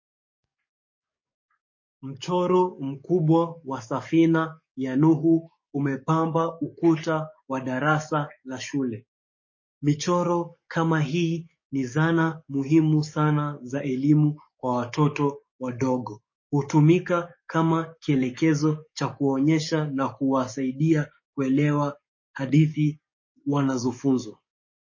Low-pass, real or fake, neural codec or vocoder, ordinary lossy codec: 7.2 kHz; fake; codec, 44.1 kHz, 7.8 kbps, DAC; MP3, 32 kbps